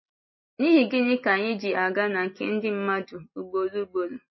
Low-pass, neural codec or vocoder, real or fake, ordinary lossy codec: 7.2 kHz; none; real; MP3, 24 kbps